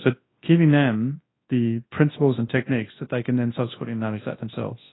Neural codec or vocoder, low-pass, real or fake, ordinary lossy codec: codec, 24 kHz, 0.9 kbps, WavTokenizer, large speech release; 7.2 kHz; fake; AAC, 16 kbps